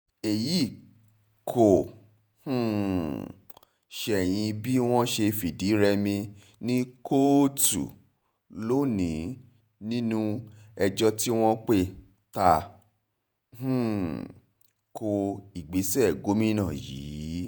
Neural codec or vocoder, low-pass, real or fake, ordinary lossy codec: vocoder, 48 kHz, 128 mel bands, Vocos; none; fake; none